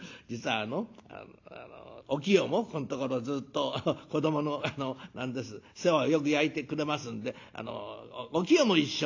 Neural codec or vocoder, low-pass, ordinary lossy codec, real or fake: none; 7.2 kHz; AAC, 48 kbps; real